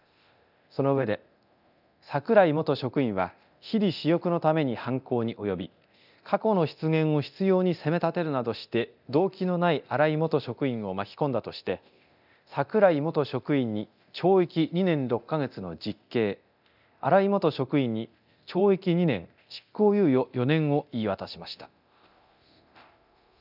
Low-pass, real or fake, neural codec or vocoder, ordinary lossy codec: 5.4 kHz; fake; codec, 24 kHz, 0.9 kbps, DualCodec; none